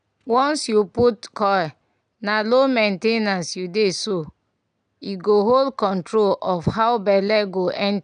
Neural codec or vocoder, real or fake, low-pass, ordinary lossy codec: none; real; 9.9 kHz; none